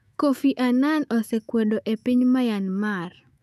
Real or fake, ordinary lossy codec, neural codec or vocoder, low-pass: fake; none; autoencoder, 48 kHz, 128 numbers a frame, DAC-VAE, trained on Japanese speech; 14.4 kHz